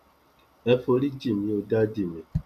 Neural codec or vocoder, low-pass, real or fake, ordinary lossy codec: none; 14.4 kHz; real; none